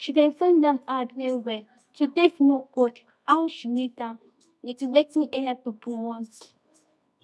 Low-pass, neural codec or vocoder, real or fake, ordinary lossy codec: none; codec, 24 kHz, 0.9 kbps, WavTokenizer, medium music audio release; fake; none